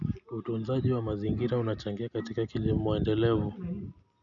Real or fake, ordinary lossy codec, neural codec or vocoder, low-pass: real; none; none; 7.2 kHz